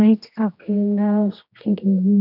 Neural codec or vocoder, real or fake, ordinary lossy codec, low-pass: codec, 16 kHz, 1 kbps, X-Codec, HuBERT features, trained on general audio; fake; none; 5.4 kHz